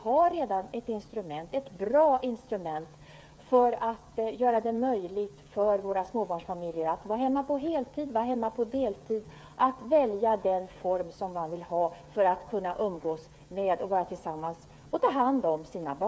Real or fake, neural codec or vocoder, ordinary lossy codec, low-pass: fake; codec, 16 kHz, 8 kbps, FreqCodec, smaller model; none; none